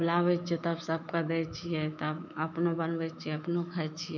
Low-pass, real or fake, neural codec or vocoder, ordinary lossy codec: 7.2 kHz; fake; autoencoder, 48 kHz, 128 numbers a frame, DAC-VAE, trained on Japanese speech; none